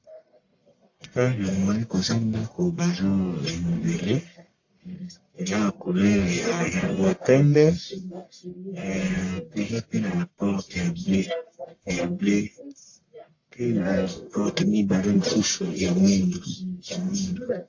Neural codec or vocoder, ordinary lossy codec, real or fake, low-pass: codec, 44.1 kHz, 1.7 kbps, Pupu-Codec; AAC, 48 kbps; fake; 7.2 kHz